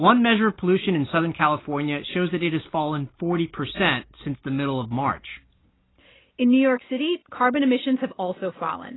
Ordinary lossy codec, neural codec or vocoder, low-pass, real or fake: AAC, 16 kbps; none; 7.2 kHz; real